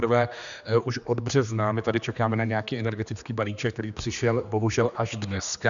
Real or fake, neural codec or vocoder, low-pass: fake; codec, 16 kHz, 2 kbps, X-Codec, HuBERT features, trained on general audio; 7.2 kHz